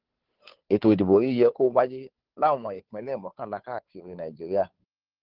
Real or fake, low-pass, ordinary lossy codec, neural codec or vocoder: fake; 5.4 kHz; Opus, 16 kbps; codec, 16 kHz, 2 kbps, FunCodec, trained on Chinese and English, 25 frames a second